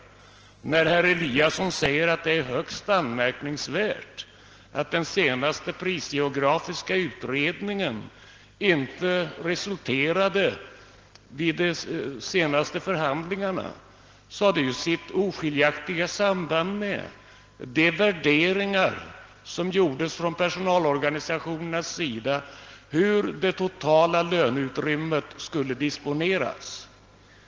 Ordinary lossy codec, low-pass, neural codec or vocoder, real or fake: Opus, 16 kbps; 7.2 kHz; none; real